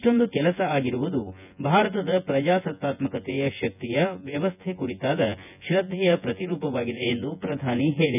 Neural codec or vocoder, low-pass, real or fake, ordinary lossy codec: vocoder, 24 kHz, 100 mel bands, Vocos; 3.6 kHz; fake; none